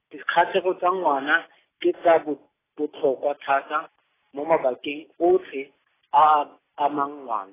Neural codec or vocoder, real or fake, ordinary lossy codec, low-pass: none; real; AAC, 16 kbps; 3.6 kHz